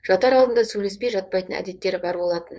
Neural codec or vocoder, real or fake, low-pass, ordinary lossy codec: codec, 16 kHz, 4.8 kbps, FACodec; fake; none; none